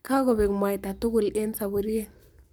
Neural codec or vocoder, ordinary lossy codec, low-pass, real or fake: codec, 44.1 kHz, 7.8 kbps, Pupu-Codec; none; none; fake